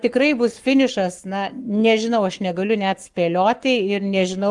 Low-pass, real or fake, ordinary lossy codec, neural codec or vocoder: 10.8 kHz; fake; Opus, 24 kbps; codec, 44.1 kHz, 7.8 kbps, Pupu-Codec